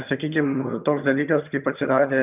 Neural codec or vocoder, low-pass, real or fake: vocoder, 22.05 kHz, 80 mel bands, HiFi-GAN; 3.6 kHz; fake